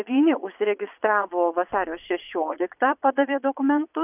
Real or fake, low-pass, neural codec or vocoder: fake; 3.6 kHz; vocoder, 22.05 kHz, 80 mel bands, Vocos